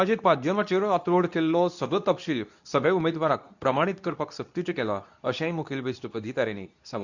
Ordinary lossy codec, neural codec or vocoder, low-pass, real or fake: none; codec, 24 kHz, 0.9 kbps, WavTokenizer, medium speech release version 2; 7.2 kHz; fake